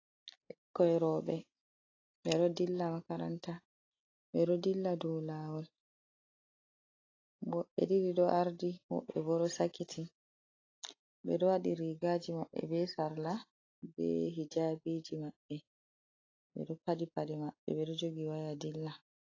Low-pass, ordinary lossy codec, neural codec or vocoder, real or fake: 7.2 kHz; AAC, 32 kbps; none; real